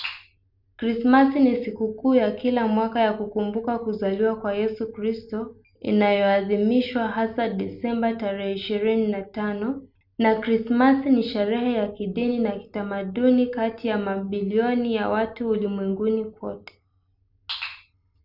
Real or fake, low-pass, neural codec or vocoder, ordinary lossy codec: real; 5.4 kHz; none; none